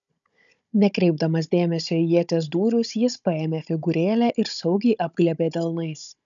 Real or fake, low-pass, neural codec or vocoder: fake; 7.2 kHz; codec, 16 kHz, 16 kbps, FunCodec, trained on Chinese and English, 50 frames a second